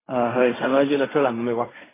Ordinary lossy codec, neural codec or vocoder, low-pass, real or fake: AAC, 16 kbps; codec, 16 kHz in and 24 kHz out, 0.4 kbps, LongCat-Audio-Codec, fine tuned four codebook decoder; 3.6 kHz; fake